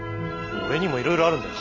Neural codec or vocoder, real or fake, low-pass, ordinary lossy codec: none; real; 7.2 kHz; none